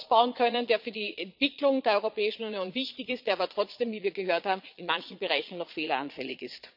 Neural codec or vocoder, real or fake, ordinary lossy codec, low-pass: vocoder, 22.05 kHz, 80 mel bands, Vocos; fake; none; 5.4 kHz